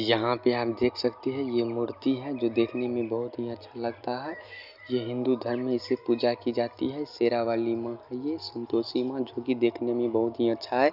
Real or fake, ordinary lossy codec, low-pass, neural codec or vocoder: real; none; 5.4 kHz; none